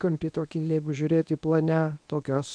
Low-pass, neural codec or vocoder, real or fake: 9.9 kHz; codec, 24 kHz, 0.9 kbps, WavTokenizer, medium speech release version 1; fake